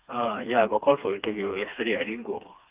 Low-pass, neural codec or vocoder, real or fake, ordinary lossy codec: 3.6 kHz; codec, 16 kHz, 2 kbps, FreqCodec, smaller model; fake; Opus, 24 kbps